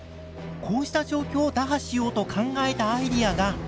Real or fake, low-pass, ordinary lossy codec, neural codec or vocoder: real; none; none; none